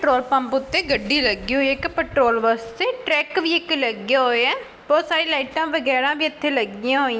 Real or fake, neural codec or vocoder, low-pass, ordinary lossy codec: real; none; none; none